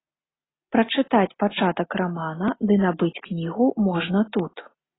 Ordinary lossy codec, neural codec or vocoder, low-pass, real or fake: AAC, 16 kbps; none; 7.2 kHz; real